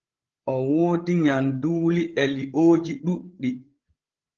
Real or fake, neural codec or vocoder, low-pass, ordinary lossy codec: fake; codec, 16 kHz, 8 kbps, FreqCodec, larger model; 7.2 kHz; Opus, 16 kbps